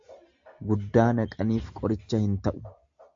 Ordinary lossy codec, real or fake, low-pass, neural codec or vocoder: AAC, 64 kbps; real; 7.2 kHz; none